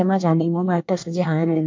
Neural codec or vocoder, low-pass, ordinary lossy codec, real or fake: codec, 24 kHz, 1 kbps, SNAC; 7.2 kHz; MP3, 64 kbps; fake